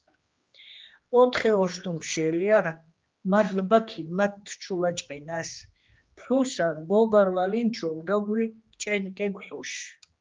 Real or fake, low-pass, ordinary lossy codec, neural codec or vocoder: fake; 7.2 kHz; Opus, 32 kbps; codec, 16 kHz, 2 kbps, X-Codec, HuBERT features, trained on general audio